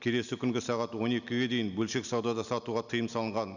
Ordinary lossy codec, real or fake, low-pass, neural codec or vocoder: none; real; 7.2 kHz; none